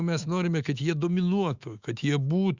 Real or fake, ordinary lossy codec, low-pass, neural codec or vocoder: fake; Opus, 64 kbps; 7.2 kHz; autoencoder, 48 kHz, 128 numbers a frame, DAC-VAE, trained on Japanese speech